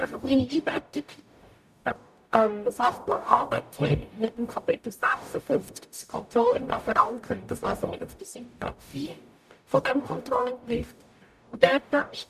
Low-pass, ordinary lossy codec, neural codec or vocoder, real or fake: 14.4 kHz; none; codec, 44.1 kHz, 0.9 kbps, DAC; fake